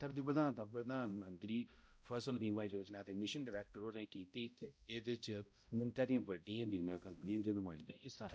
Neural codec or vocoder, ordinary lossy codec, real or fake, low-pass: codec, 16 kHz, 0.5 kbps, X-Codec, HuBERT features, trained on balanced general audio; none; fake; none